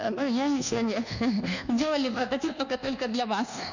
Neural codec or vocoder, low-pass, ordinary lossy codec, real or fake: codec, 24 kHz, 1.2 kbps, DualCodec; 7.2 kHz; none; fake